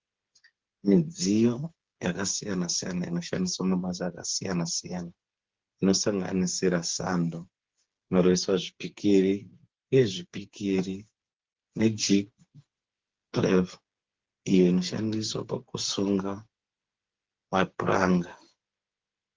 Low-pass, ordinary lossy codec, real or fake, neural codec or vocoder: 7.2 kHz; Opus, 16 kbps; fake; codec, 16 kHz, 8 kbps, FreqCodec, smaller model